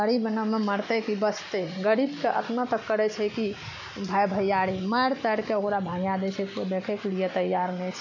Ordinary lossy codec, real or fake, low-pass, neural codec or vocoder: none; real; 7.2 kHz; none